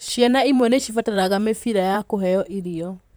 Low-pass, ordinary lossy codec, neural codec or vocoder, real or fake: none; none; vocoder, 44.1 kHz, 128 mel bands, Pupu-Vocoder; fake